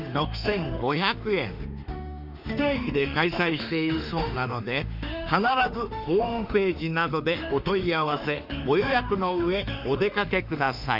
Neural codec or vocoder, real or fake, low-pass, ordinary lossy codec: autoencoder, 48 kHz, 32 numbers a frame, DAC-VAE, trained on Japanese speech; fake; 5.4 kHz; none